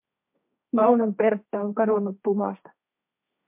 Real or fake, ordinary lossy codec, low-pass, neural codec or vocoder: fake; MP3, 32 kbps; 3.6 kHz; codec, 16 kHz, 1.1 kbps, Voila-Tokenizer